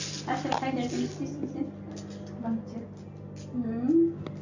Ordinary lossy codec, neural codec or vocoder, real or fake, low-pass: none; none; real; 7.2 kHz